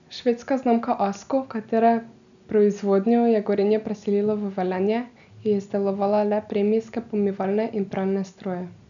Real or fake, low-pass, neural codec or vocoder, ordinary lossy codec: real; 7.2 kHz; none; none